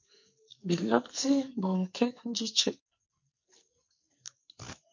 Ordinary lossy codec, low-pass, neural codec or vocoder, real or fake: MP3, 48 kbps; 7.2 kHz; codec, 44.1 kHz, 2.6 kbps, SNAC; fake